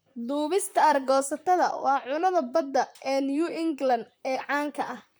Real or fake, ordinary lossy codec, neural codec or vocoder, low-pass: fake; none; vocoder, 44.1 kHz, 128 mel bands, Pupu-Vocoder; none